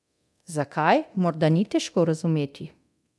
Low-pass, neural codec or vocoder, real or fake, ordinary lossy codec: none; codec, 24 kHz, 0.9 kbps, DualCodec; fake; none